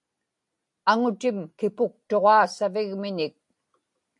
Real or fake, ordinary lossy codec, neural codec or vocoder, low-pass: real; AAC, 64 kbps; none; 10.8 kHz